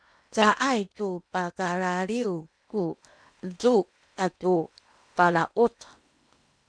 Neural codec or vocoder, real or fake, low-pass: codec, 16 kHz in and 24 kHz out, 0.8 kbps, FocalCodec, streaming, 65536 codes; fake; 9.9 kHz